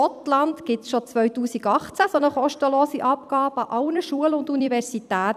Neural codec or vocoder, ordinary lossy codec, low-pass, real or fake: vocoder, 44.1 kHz, 128 mel bands every 256 samples, BigVGAN v2; none; 14.4 kHz; fake